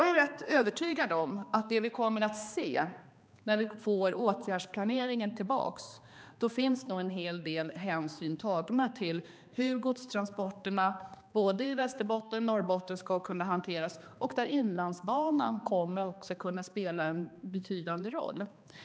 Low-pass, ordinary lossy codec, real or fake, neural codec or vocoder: none; none; fake; codec, 16 kHz, 2 kbps, X-Codec, HuBERT features, trained on balanced general audio